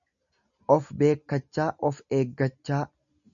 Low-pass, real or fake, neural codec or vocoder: 7.2 kHz; real; none